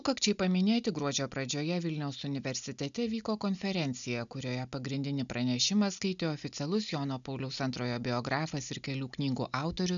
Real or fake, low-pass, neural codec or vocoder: real; 7.2 kHz; none